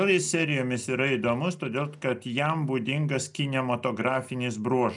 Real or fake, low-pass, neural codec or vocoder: real; 10.8 kHz; none